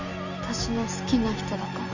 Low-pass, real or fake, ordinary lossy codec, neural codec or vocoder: 7.2 kHz; real; none; none